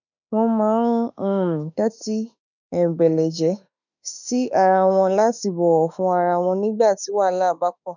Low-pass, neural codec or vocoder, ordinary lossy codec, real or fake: 7.2 kHz; autoencoder, 48 kHz, 32 numbers a frame, DAC-VAE, trained on Japanese speech; none; fake